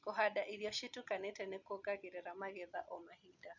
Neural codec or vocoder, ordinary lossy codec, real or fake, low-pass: none; none; real; 7.2 kHz